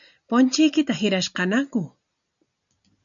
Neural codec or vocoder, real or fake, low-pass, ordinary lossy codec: none; real; 7.2 kHz; MP3, 96 kbps